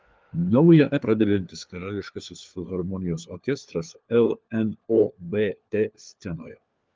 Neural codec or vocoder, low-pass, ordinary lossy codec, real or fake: codec, 16 kHz, 4 kbps, FunCodec, trained on LibriTTS, 50 frames a second; 7.2 kHz; Opus, 32 kbps; fake